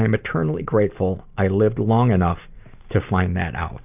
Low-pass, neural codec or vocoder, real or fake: 3.6 kHz; none; real